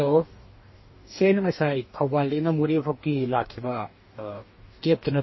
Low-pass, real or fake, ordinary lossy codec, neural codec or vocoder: 7.2 kHz; fake; MP3, 24 kbps; codec, 44.1 kHz, 2.6 kbps, DAC